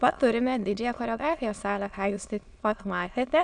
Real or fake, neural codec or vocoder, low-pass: fake; autoencoder, 22.05 kHz, a latent of 192 numbers a frame, VITS, trained on many speakers; 9.9 kHz